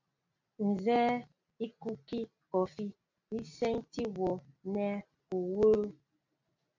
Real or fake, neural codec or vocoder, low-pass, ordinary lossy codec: real; none; 7.2 kHz; MP3, 64 kbps